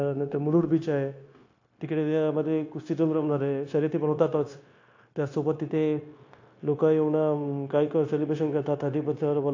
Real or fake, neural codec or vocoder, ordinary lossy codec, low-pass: fake; codec, 16 kHz, 0.9 kbps, LongCat-Audio-Codec; AAC, 48 kbps; 7.2 kHz